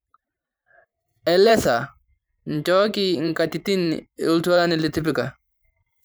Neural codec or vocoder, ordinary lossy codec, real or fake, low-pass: vocoder, 44.1 kHz, 128 mel bands every 512 samples, BigVGAN v2; none; fake; none